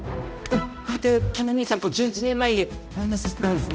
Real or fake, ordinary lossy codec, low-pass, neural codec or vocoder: fake; none; none; codec, 16 kHz, 0.5 kbps, X-Codec, HuBERT features, trained on balanced general audio